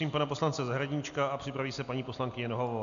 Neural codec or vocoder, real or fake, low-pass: none; real; 7.2 kHz